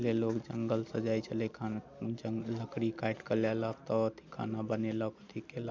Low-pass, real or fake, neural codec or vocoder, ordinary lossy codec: none; real; none; none